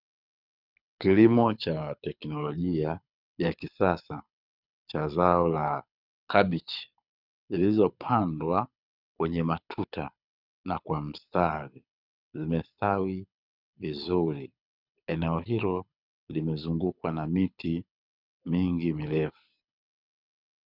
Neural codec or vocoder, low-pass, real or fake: codec, 24 kHz, 6 kbps, HILCodec; 5.4 kHz; fake